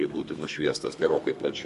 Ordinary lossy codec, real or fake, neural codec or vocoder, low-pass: MP3, 48 kbps; fake; codec, 32 kHz, 1.9 kbps, SNAC; 14.4 kHz